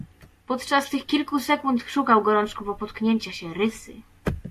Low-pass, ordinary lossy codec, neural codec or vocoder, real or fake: 14.4 kHz; AAC, 64 kbps; none; real